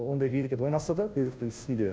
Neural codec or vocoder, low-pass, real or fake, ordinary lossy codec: codec, 16 kHz, 0.5 kbps, FunCodec, trained on Chinese and English, 25 frames a second; none; fake; none